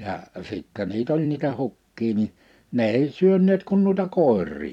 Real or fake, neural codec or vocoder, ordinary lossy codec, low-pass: fake; vocoder, 44.1 kHz, 128 mel bands every 256 samples, BigVGAN v2; none; 19.8 kHz